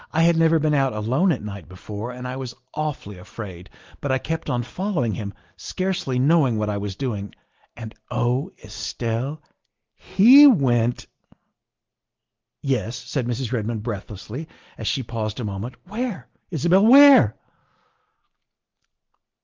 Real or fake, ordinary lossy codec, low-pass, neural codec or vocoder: real; Opus, 32 kbps; 7.2 kHz; none